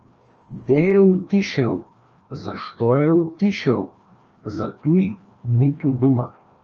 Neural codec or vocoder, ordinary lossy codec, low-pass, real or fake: codec, 16 kHz, 1 kbps, FreqCodec, larger model; Opus, 24 kbps; 7.2 kHz; fake